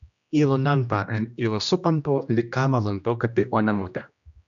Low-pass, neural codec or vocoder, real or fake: 7.2 kHz; codec, 16 kHz, 1 kbps, X-Codec, HuBERT features, trained on general audio; fake